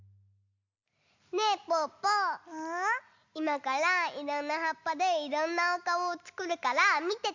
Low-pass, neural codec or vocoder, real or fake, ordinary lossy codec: 7.2 kHz; none; real; MP3, 64 kbps